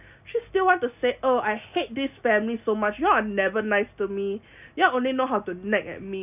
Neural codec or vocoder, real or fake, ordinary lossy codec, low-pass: none; real; none; 3.6 kHz